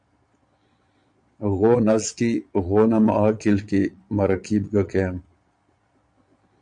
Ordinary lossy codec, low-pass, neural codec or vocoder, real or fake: MP3, 64 kbps; 9.9 kHz; vocoder, 22.05 kHz, 80 mel bands, Vocos; fake